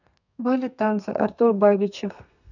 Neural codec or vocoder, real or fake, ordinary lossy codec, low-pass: codec, 32 kHz, 1.9 kbps, SNAC; fake; none; 7.2 kHz